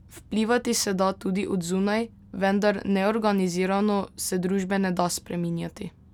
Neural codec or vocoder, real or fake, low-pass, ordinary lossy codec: none; real; 19.8 kHz; none